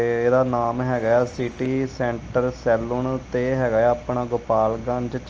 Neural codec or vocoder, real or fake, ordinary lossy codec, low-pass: none; real; Opus, 24 kbps; 7.2 kHz